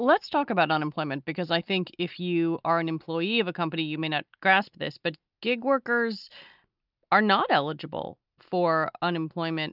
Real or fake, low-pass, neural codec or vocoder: real; 5.4 kHz; none